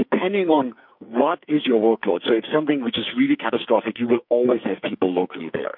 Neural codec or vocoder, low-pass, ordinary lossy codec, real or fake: codec, 44.1 kHz, 2.6 kbps, SNAC; 5.4 kHz; MP3, 48 kbps; fake